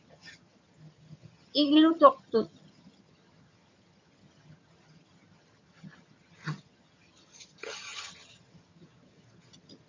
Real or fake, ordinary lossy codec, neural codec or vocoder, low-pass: fake; MP3, 48 kbps; vocoder, 22.05 kHz, 80 mel bands, HiFi-GAN; 7.2 kHz